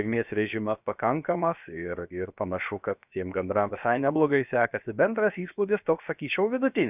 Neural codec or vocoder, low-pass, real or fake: codec, 16 kHz, 0.7 kbps, FocalCodec; 3.6 kHz; fake